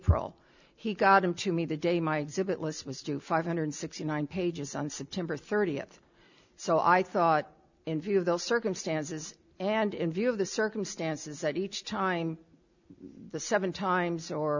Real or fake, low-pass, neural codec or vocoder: real; 7.2 kHz; none